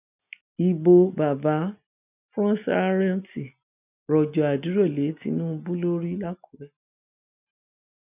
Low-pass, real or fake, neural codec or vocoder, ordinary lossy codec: 3.6 kHz; real; none; none